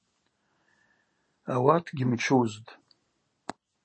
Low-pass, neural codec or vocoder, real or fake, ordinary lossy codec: 9.9 kHz; none; real; MP3, 32 kbps